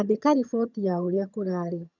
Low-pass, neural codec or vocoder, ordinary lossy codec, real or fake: 7.2 kHz; vocoder, 22.05 kHz, 80 mel bands, HiFi-GAN; none; fake